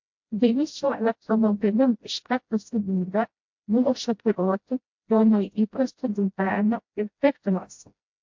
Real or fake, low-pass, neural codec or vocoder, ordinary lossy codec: fake; 7.2 kHz; codec, 16 kHz, 0.5 kbps, FreqCodec, smaller model; MP3, 48 kbps